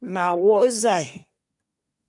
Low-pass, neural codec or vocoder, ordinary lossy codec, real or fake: 10.8 kHz; codec, 24 kHz, 1 kbps, SNAC; MP3, 96 kbps; fake